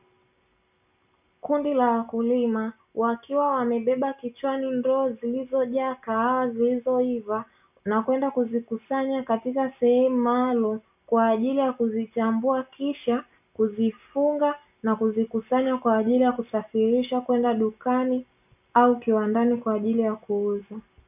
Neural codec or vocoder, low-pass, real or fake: none; 3.6 kHz; real